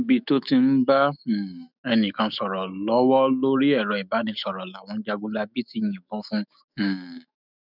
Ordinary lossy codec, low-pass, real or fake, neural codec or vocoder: none; 5.4 kHz; fake; autoencoder, 48 kHz, 128 numbers a frame, DAC-VAE, trained on Japanese speech